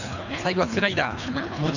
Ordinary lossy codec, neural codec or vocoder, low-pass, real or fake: none; codec, 24 kHz, 3 kbps, HILCodec; 7.2 kHz; fake